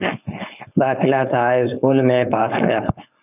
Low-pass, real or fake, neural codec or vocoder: 3.6 kHz; fake; codec, 16 kHz, 4.8 kbps, FACodec